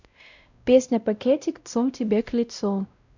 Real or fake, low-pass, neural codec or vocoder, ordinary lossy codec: fake; 7.2 kHz; codec, 16 kHz, 0.5 kbps, X-Codec, WavLM features, trained on Multilingual LibriSpeech; none